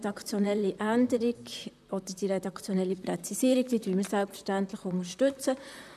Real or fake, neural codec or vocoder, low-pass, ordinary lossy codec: fake; vocoder, 44.1 kHz, 128 mel bands, Pupu-Vocoder; 14.4 kHz; none